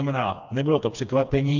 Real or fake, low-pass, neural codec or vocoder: fake; 7.2 kHz; codec, 16 kHz, 2 kbps, FreqCodec, smaller model